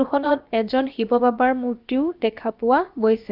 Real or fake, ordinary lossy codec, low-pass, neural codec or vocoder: fake; Opus, 32 kbps; 5.4 kHz; codec, 16 kHz, about 1 kbps, DyCAST, with the encoder's durations